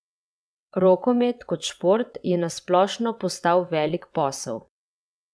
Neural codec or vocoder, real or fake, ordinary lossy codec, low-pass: vocoder, 22.05 kHz, 80 mel bands, WaveNeXt; fake; none; none